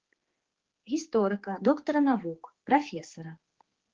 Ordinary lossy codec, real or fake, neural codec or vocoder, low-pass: Opus, 16 kbps; fake; codec, 16 kHz, 4 kbps, X-Codec, HuBERT features, trained on general audio; 7.2 kHz